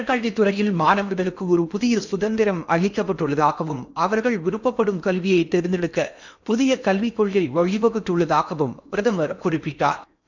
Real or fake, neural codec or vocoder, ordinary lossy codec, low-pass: fake; codec, 16 kHz in and 24 kHz out, 0.8 kbps, FocalCodec, streaming, 65536 codes; none; 7.2 kHz